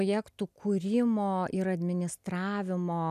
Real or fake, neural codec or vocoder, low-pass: real; none; 14.4 kHz